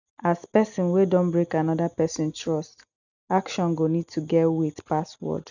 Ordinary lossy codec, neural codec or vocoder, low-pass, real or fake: none; none; 7.2 kHz; real